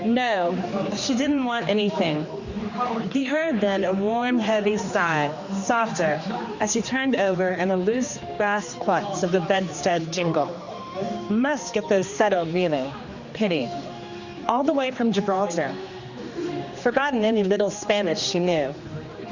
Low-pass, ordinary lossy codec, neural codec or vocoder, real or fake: 7.2 kHz; Opus, 64 kbps; codec, 16 kHz, 2 kbps, X-Codec, HuBERT features, trained on general audio; fake